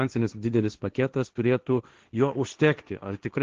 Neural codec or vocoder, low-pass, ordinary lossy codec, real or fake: codec, 16 kHz, 1.1 kbps, Voila-Tokenizer; 7.2 kHz; Opus, 16 kbps; fake